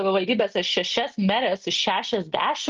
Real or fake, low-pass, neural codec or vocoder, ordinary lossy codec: real; 7.2 kHz; none; Opus, 32 kbps